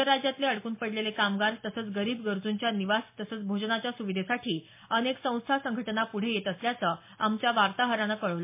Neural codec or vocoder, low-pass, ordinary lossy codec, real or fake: none; 3.6 kHz; MP3, 24 kbps; real